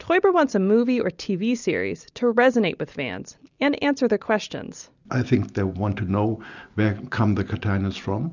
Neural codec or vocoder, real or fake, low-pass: none; real; 7.2 kHz